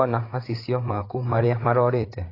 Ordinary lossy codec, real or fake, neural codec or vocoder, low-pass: AAC, 24 kbps; fake; codec, 16 kHz, 16 kbps, FreqCodec, larger model; 5.4 kHz